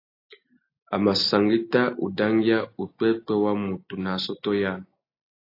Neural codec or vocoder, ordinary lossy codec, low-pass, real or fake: none; AAC, 32 kbps; 5.4 kHz; real